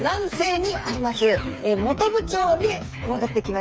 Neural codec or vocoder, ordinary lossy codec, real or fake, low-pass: codec, 16 kHz, 4 kbps, FreqCodec, smaller model; none; fake; none